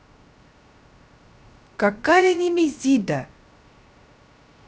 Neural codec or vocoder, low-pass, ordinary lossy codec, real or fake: codec, 16 kHz, 0.3 kbps, FocalCodec; none; none; fake